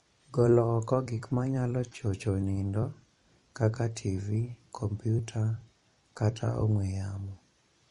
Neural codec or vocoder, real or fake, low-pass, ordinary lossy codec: vocoder, 44.1 kHz, 128 mel bands every 256 samples, BigVGAN v2; fake; 19.8 kHz; MP3, 48 kbps